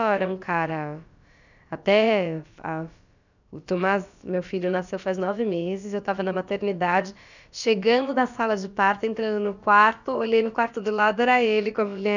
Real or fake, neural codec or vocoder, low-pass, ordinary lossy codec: fake; codec, 16 kHz, about 1 kbps, DyCAST, with the encoder's durations; 7.2 kHz; none